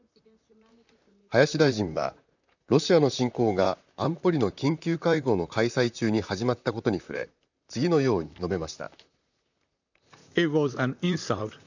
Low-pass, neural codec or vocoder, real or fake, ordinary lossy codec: 7.2 kHz; vocoder, 44.1 kHz, 128 mel bands, Pupu-Vocoder; fake; none